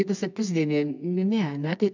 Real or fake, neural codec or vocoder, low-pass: fake; codec, 24 kHz, 0.9 kbps, WavTokenizer, medium music audio release; 7.2 kHz